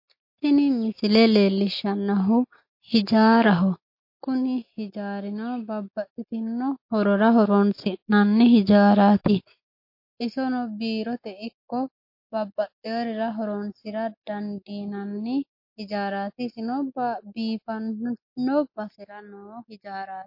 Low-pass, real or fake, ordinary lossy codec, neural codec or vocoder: 5.4 kHz; real; MP3, 32 kbps; none